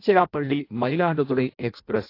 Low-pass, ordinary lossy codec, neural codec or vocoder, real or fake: 5.4 kHz; AAC, 32 kbps; codec, 16 kHz in and 24 kHz out, 0.6 kbps, FireRedTTS-2 codec; fake